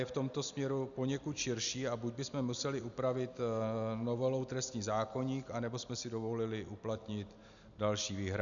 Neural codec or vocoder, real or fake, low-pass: none; real; 7.2 kHz